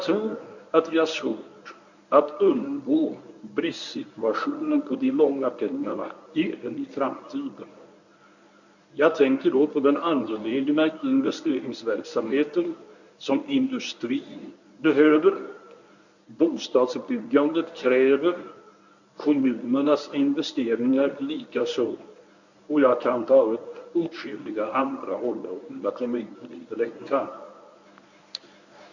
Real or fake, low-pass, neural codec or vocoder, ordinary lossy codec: fake; 7.2 kHz; codec, 24 kHz, 0.9 kbps, WavTokenizer, medium speech release version 1; none